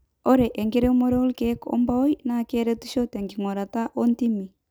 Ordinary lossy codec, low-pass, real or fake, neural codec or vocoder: none; none; real; none